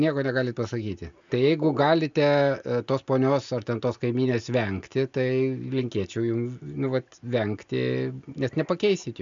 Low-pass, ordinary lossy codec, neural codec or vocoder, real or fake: 7.2 kHz; MP3, 64 kbps; none; real